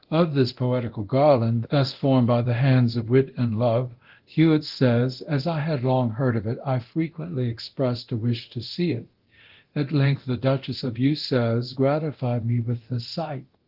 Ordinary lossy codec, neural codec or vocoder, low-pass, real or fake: Opus, 16 kbps; codec, 24 kHz, 0.9 kbps, DualCodec; 5.4 kHz; fake